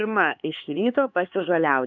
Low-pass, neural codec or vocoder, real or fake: 7.2 kHz; codec, 16 kHz, 4 kbps, X-Codec, HuBERT features, trained on LibriSpeech; fake